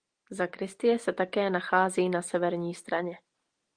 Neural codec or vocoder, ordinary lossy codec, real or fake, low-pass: none; Opus, 24 kbps; real; 9.9 kHz